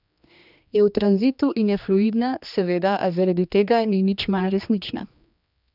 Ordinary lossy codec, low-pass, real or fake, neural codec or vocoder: none; 5.4 kHz; fake; codec, 16 kHz, 2 kbps, X-Codec, HuBERT features, trained on general audio